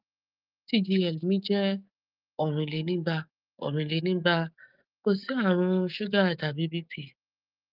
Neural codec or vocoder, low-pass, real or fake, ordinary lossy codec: autoencoder, 48 kHz, 128 numbers a frame, DAC-VAE, trained on Japanese speech; 5.4 kHz; fake; Opus, 24 kbps